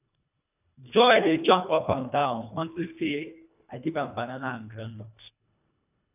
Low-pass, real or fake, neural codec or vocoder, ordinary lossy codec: 3.6 kHz; fake; codec, 24 kHz, 1.5 kbps, HILCodec; none